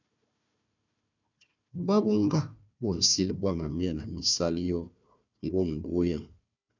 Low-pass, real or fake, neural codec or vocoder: 7.2 kHz; fake; codec, 16 kHz, 1 kbps, FunCodec, trained on Chinese and English, 50 frames a second